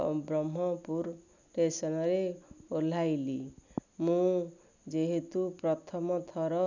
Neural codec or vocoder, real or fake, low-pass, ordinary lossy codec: none; real; 7.2 kHz; none